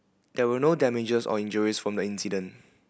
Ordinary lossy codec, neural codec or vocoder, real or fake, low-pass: none; none; real; none